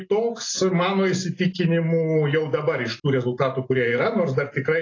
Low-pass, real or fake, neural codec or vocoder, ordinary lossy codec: 7.2 kHz; real; none; AAC, 32 kbps